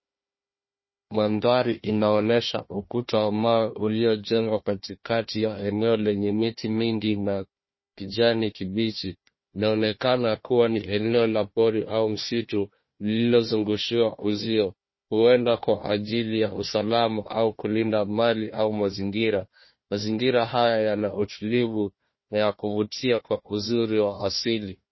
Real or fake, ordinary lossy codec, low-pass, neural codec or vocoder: fake; MP3, 24 kbps; 7.2 kHz; codec, 16 kHz, 1 kbps, FunCodec, trained on Chinese and English, 50 frames a second